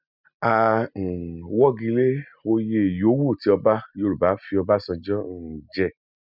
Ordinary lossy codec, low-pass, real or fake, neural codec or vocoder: none; 5.4 kHz; real; none